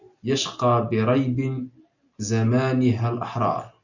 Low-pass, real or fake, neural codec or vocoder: 7.2 kHz; real; none